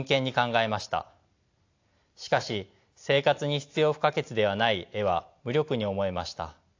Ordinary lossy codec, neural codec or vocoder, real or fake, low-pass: AAC, 48 kbps; none; real; 7.2 kHz